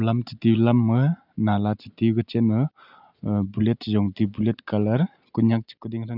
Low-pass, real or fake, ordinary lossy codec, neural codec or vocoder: 5.4 kHz; real; none; none